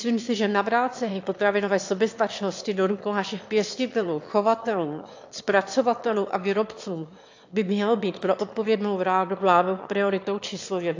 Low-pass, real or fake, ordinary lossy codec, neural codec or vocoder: 7.2 kHz; fake; AAC, 48 kbps; autoencoder, 22.05 kHz, a latent of 192 numbers a frame, VITS, trained on one speaker